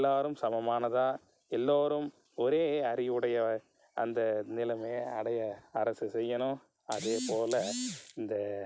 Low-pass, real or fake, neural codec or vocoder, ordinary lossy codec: none; real; none; none